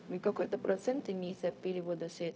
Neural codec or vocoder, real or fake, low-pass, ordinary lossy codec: codec, 16 kHz, 0.4 kbps, LongCat-Audio-Codec; fake; none; none